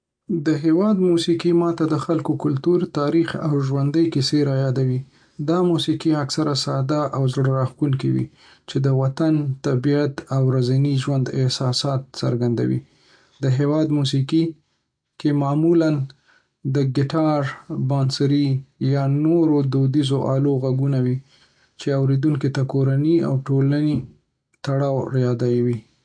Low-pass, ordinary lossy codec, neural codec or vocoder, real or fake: 9.9 kHz; MP3, 64 kbps; none; real